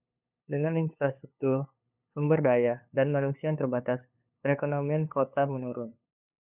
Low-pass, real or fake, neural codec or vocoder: 3.6 kHz; fake; codec, 16 kHz, 2 kbps, FunCodec, trained on LibriTTS, 25 frames a second